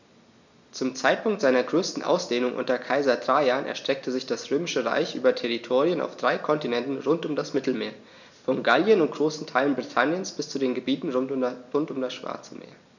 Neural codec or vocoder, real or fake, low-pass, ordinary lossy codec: none; real; 7.2 kHz; none